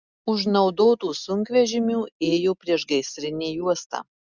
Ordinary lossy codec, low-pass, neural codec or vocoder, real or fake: Opus, 64 kbps; 7.2 kHz; none; real